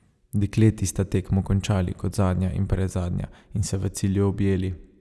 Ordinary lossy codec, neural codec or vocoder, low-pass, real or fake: none; none; none; real